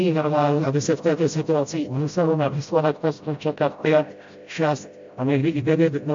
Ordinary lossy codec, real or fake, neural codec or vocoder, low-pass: MP3, 48 kbps; fake; codec, 16 kHz, 0.5 kbps, FreqCodec, smaller model; 7.2 kHz